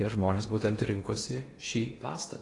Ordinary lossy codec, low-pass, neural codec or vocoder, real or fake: AAC, 32 kbps; 10.8 kHz; codec, 16 kHz in and 24 kHz out, 0.8 kbps, FocalCodec, streaming, 65536 codes; fake